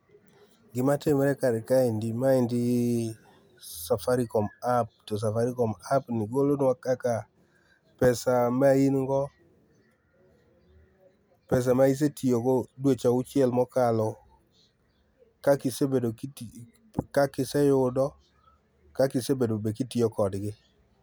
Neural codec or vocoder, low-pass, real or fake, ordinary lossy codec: none; none; real; none